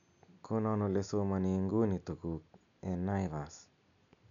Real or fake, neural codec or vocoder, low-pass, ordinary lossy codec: real; none; 7.2 kHz; none